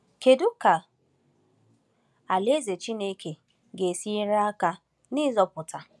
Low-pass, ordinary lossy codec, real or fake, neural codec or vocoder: none; none; real; none